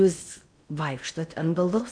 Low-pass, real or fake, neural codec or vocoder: 9.9 kHz; fake; codec, 16 kHz in and 24 kHz out, 0.6 kbps, FocalCodec, streaming, 4096 codes